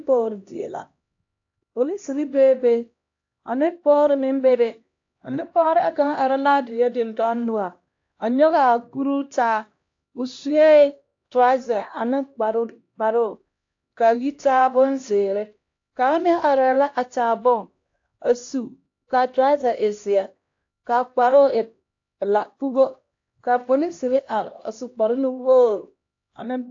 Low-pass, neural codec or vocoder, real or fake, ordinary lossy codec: 7.2 kHz; codec, 16 kHz, 1 kbps, X-Codec, HuBERT features, trained on LibriSpeech; fake; AAC, 48 kbps